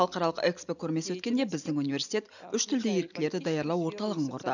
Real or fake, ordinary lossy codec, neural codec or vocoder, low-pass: real; none; none; 7.2 kHz